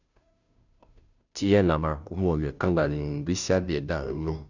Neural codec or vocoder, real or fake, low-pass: codec, 16 kHz, 0.5 kbps, FunCodec, trained on Chinese and English, 25 frames a second; fake; 7.2 kHz